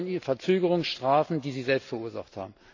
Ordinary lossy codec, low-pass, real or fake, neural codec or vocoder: AAC, 48 kbps; 7.2 kHz; real; none